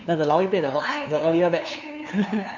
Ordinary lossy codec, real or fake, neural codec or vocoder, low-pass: none; fake; codec, 16 kHz, 2 kbps, FunCodec, trained on LibriTTS, 25 frames a second; 7.2 kHz